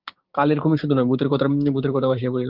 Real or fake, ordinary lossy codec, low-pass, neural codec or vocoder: fake; Opus, 32 kbps; 5.4 kHz; codec, 16 kHz, 6 kbps, DAC